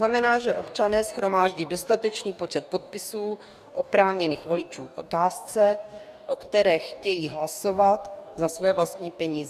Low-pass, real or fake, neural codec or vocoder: 14.4 kHz; fake; codec, 44.1 kHz, 2.6 kbps, DAC